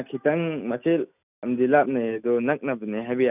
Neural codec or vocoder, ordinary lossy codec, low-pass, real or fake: none; none; 3.6 kHz; real